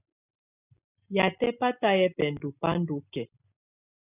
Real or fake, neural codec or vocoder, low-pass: real; none; 3.6 kHz